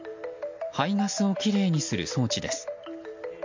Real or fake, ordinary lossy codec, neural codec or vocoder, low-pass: real; MP3, 48 kbps; none; 7.2 kHz